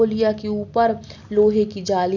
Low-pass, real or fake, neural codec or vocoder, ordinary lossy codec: 7.2 kHz; real; none; none